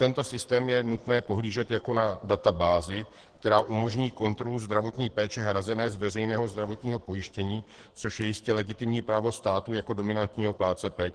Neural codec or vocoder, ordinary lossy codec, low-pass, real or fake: codec, 44.1 kHz, 2.6 kbps, SNAC; Opus, 16 kbps; 10.8 kHz; fake